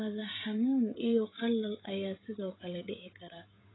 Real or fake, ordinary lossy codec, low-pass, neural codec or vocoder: fake; AAC, 16 kbps; 7.2 kHz; codec, 16 kHz, 16 kbps, FreqCodec, smaller model